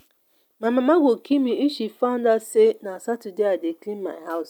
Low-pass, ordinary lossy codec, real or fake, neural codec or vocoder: 19.8 kHz; none; real; none